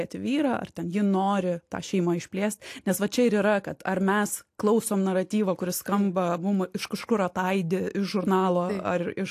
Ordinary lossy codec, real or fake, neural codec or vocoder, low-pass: AAC, 64 kbps; real; none; 14.4 kHz